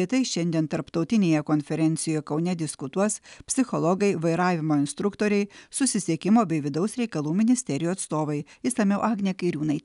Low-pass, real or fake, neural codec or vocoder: 10.8 kHz; real; none